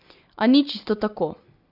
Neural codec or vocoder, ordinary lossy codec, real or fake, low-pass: none; none; real; 5.4 kHz